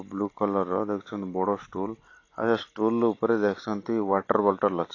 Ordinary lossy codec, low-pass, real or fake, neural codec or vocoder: AAC, 32 kbps; 7.2 kHz; real; none